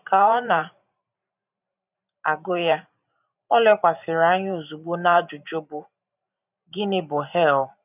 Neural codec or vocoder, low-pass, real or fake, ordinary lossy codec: vocoder, 44.1 kHz, 128 mel bands every 512 samples, BigVGAN v2; 3.6 kHz; fake; none